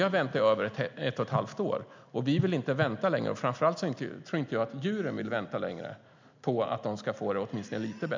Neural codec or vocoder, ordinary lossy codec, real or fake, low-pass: none; MP3, 64 kbps; real; 7.2 kHz